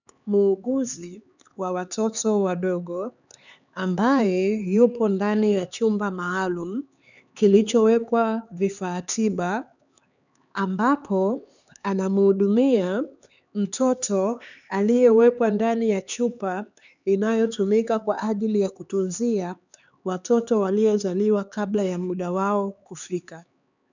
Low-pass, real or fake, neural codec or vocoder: 7.2 kHz; fake; codec, 16 kHz, 4 kbps, X-Codec, HuBERT features, trained on LibriSpeech